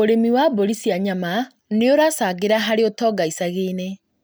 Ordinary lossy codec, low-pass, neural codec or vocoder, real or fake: none; none; none; real